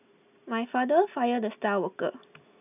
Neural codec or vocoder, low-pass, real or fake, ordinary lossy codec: none; 3.6 kHz; real; none